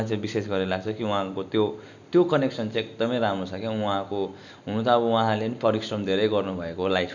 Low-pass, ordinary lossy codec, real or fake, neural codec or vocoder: 7.2 kHz; none; real; none